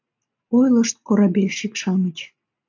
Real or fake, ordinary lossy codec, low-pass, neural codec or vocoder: real; MP3, 48 kbps; 7.2 kHz; none